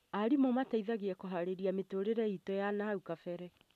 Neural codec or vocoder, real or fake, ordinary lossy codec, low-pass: none; real; none; 14.4 kHz